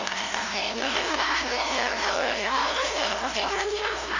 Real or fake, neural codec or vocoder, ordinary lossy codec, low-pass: fake; codec, 16 kHz, 0.5 kbps, FunCodec, trained on LibriTTS, 25 frames a second; none; 7.2 kHz